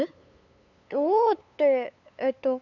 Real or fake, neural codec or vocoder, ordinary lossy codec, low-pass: fake; codec, 16 kHz, 8 kbps, FunCodec, trained on LibriTTS, 25 frames a second; none; 7.2 kHz